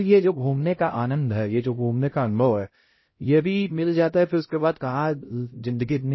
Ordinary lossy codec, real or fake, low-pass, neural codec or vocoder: MP3, 24 kbps; fake; 7.2 kHz; codec, 16 kHz, 0.5 kbps, X-Codec, HuBERT features, trained on LibriSpeech